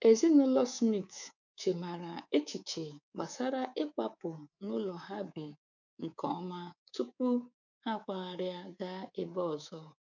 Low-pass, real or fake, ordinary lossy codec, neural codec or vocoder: 7.2 kHz; fake; none; codec, 24 kHz, 3.1 kbps, DualCodec